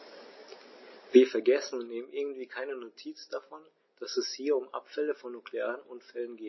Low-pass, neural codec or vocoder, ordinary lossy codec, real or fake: 7.2 kHz; none; MP3, 24 kbps; real